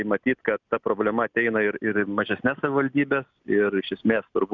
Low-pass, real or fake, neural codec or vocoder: 7.2 kHz; real; none